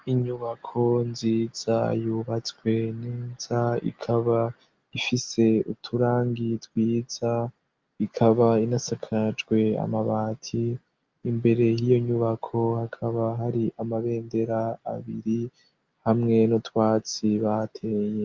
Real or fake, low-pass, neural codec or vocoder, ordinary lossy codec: real; 7.2 kHz; none; Opus, 24 kbps